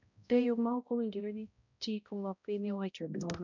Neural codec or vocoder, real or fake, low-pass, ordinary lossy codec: codec, 16 kHz, 0.5 kbps, X-Codec, HuBERT features, trained on balanced general audio; fake; 7.2 kHz; none